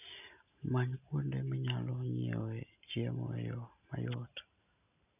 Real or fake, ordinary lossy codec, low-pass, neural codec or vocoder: real; none; 3.6 kHz; none